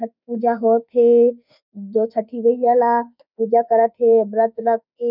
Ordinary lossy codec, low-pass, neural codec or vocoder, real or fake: none; 5.4 kHz; autoencoder, 48 kHz, 32 numbers a frame, DAC-VAE, trained on Japanese speech; fake